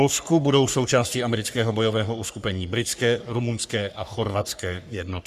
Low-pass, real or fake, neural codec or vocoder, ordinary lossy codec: 14.4 kHz; fake; codec, 44.1 kHz, 3.4 kbps, Pupu-Codec; Opus, 64 kbps